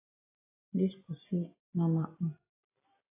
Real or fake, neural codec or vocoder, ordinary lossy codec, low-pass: real; none; AAC, 24 kbps; 3.6 kHz